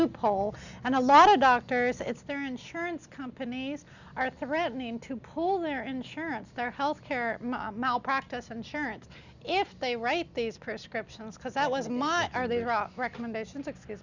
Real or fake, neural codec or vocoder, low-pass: real; none; 7.2 kHz